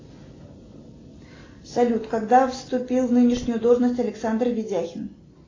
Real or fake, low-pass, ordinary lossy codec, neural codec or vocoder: real; 7.2 kHz; AAC, 32 kbps; none